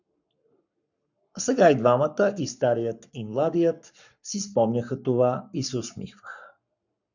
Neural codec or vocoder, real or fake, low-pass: codec, 44.1 kHz, 7.8 kbps, Pupu-Codec; fake; 7.2 kHz